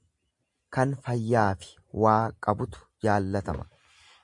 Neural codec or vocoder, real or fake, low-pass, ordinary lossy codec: none; real; 10.8 kHz; MP3, 64 kbps